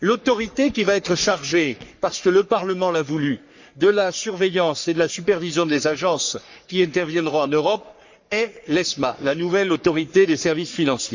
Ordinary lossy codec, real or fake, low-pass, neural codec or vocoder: Opus, 64 kbps; fake; 7.2 kHz; codec, 44.1 kHz, 3.4 kbps, Pupu-Codec